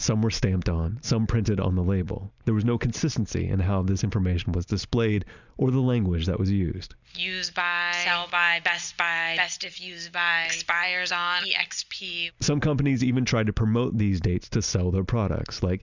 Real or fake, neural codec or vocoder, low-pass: real; none; 7.2 kHz